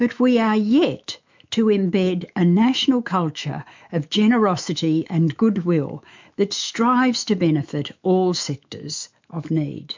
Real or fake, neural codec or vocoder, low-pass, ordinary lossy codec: fake; autoencoder, 48 kHz, 128 numbers a frame, DAC-VAE, trained on Japanese speech; 7.2 kHz; MP3, 64 kbps